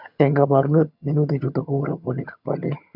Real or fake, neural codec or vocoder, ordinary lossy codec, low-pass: fake; vocoder, 22.05 kHz, 80 mel bands, HiFi-GAN; none; 5.4 kHz